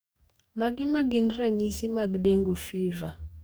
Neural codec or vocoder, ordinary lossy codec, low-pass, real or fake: codec, 44.1 kHz, 2.6 kbps, DAC; none; none; fake